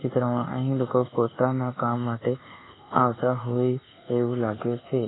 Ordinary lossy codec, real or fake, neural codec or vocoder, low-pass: AAC, 16 kbps; fake; codec, 24 kHz, 1.2 kbps, DualCodec; 7.2 kHz